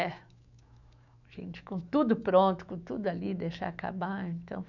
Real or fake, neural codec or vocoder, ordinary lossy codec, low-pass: fake; autoencoder, 48 kHz, 128 numbers a frame, DAC-VAE, trained on Japanese speech; none; 7.2 kHz